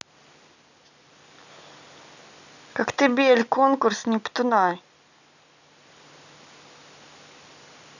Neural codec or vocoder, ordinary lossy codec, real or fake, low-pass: none; none; real; 7.2 kHz